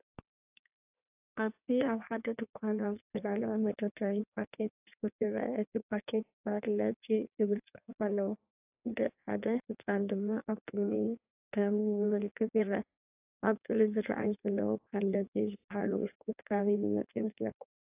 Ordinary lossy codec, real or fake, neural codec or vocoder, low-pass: AAC, 32 kbps; fake; codec, 16 kHz in and 24 kHz out, 1.1 kbps, FireRedTTS-2 codec; 3.6 kHz